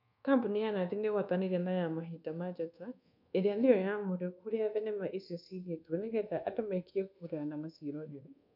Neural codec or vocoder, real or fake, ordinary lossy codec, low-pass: codec, 24 kHz, 1.2 kbps, DualCodec; fake; none; 5.4 kHz